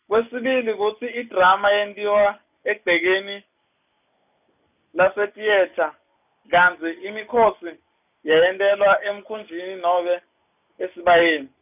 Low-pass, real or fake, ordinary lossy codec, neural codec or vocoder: 3.6 kHz; real; none; none